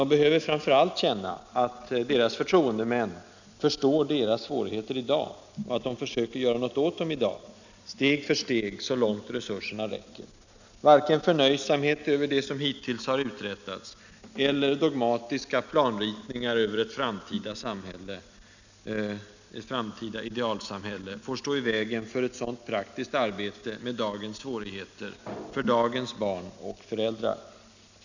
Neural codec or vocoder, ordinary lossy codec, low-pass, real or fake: none; none; 7.2 kHz; real